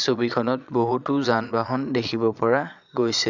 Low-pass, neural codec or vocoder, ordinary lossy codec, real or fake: 7.2 kHz; vocoder, 22.05 kHz, 80 mel bands, Vocos; none; fake